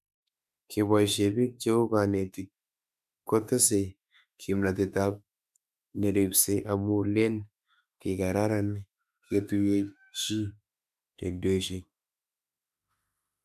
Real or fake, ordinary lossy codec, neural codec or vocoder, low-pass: fake; none; autoencoder, 48 kHz, 32 numbers a frame, DAC-VAE, trained on Japanese speech; 14.4 kHz